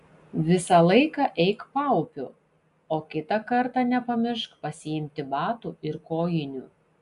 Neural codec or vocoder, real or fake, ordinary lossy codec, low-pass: none; real; AAC, 96 kbps; 10.8 kHz